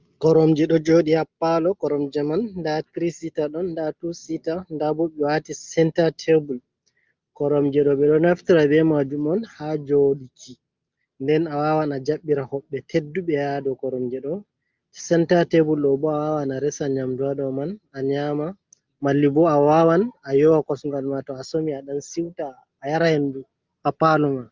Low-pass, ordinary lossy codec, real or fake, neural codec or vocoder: 7.2 kHz; Opus, 24 kbps; real; none